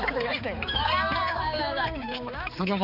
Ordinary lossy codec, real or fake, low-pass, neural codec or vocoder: none; fake; 5.4 kHz; codec, 16 kHz, 4 kbps, X-Codec, HuBERT features, trained on balanced general audio